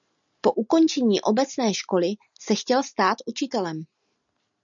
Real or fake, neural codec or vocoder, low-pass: real; none; 7.2 kHz